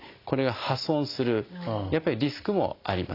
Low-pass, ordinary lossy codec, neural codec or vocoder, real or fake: 5.4 kHz; AAC, 32 kbps; none; real